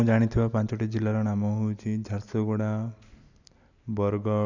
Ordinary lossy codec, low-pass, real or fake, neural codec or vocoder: none; 7.2 kHz; real; none